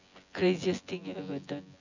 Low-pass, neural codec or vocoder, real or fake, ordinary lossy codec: 7.2 kHz; vocoder, 24 kHz, 100 mel bands, Vocos; fake; none